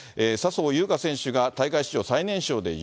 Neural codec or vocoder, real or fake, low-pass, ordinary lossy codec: none; real; none; none